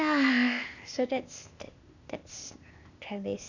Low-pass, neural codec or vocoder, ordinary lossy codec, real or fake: 7.2 kHz; codec, 16 kHz, 0.8 kbps, ZipCodec; none; fake